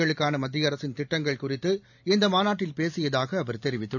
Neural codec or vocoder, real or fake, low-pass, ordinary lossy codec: none; real; 7.2 kHz; none